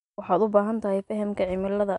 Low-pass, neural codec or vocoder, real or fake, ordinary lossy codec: 10.8 kHz; none; real; none